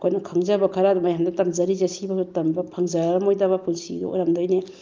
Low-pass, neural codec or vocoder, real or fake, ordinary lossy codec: 7.2 kHz; none; real; Opus, 32 kbps